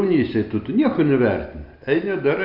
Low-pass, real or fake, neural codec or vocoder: 5.4 kHz; real; none